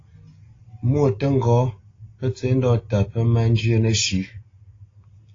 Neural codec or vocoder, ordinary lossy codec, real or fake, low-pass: none; AAC, 32 kbps; real; 7.2 kHz